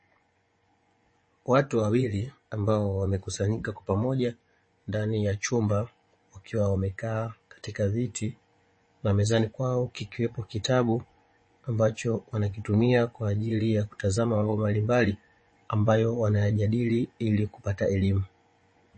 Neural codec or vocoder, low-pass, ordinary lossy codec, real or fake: vocoder, 24 kHz, 100 mel bands, Vocos; 9.9 kHz; MP3, 32 kbps; fake